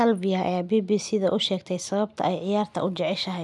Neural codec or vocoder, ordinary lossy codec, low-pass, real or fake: none; none; none; real